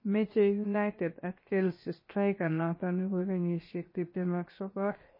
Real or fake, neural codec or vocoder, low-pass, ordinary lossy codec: fake; codec, 16 kHz, 0.7 kbps, FocalCodec; 5.4 kHz; MP3, 24 kbps